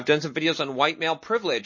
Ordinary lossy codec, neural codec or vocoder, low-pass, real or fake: MP3, 32 kbps; none; 7.2 kHz; real